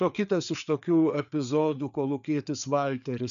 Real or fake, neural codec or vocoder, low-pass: fake; codec, 16 kHz, 4 kbps, FreqCodec, larger model; 7.2 kHz